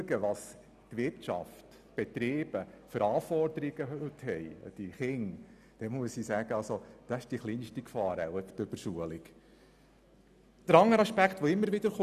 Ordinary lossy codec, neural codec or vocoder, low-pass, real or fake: none; none; 14.4 kHz; real